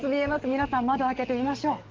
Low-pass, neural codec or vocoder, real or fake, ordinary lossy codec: 7.2 kHz; codec, 44.1 kHz, 7.8 kbps, DAC; fake; Opus, 16 kbps